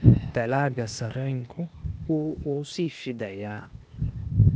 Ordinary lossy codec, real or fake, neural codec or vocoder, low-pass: none; fake; codec, 16 kHz, 0.8 kbps, ZipCodec; none